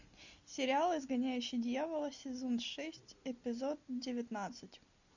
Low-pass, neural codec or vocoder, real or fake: 7.2 kHz; none; real